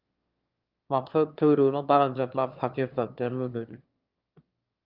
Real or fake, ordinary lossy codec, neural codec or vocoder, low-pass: fake; Opus, 24 kbps; autoencoder, 22.05 kHz, a latent of 192 numbers a frame, VITS, trained on one speaker; 5.4 kHz